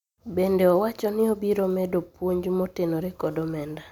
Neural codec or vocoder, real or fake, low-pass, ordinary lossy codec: none; real; 19.8 kHz; none